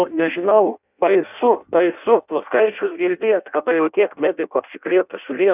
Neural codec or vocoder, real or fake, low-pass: codec, 16 kHz in and 24 kHz out, 0.6 kbps, FireRedTTS-2 codec; fake; 3.6 kHz